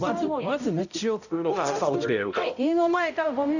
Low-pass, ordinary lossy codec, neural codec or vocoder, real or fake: 7.2 kHz; none; codec, 16 kHz, 0.5 kbps, X-Codec, HuBERT features, trained on balanced general audio; fake